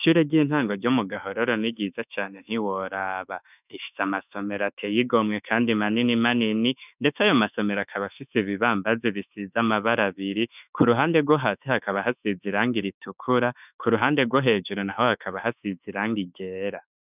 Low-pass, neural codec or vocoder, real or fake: 3.6 kHz; autoencoder, 48 kHz, 32 numbers a frame, DAC-VAE, trained on Japanese speech; fake